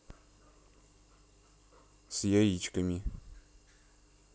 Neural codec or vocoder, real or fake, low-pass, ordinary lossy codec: none; real; none; none